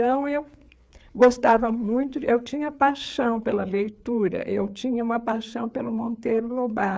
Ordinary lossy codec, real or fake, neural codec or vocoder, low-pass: none; fake; codec, 16 kHz, 4 kbps, FreqCodec, larger model; none